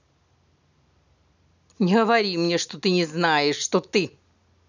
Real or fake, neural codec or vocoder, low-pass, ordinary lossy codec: real; none; 7.2 kHz; none